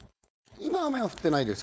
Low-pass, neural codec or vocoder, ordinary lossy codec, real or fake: none; codec, 16 kHz, 4.8 kbps, FACodec; none; fake